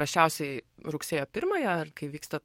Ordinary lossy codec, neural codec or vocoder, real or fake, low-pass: MP3, 64 kbps; codec, 44.1 kHz, 7.8 kbps, DAC; fake; 19.8 kHz